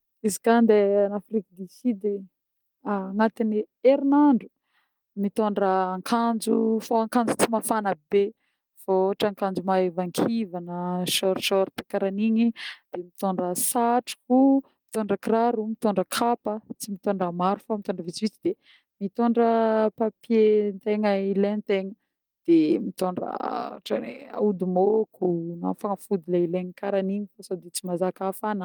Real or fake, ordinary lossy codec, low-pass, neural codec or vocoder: real; Opus, 32 kbps; 19.8 kHz; none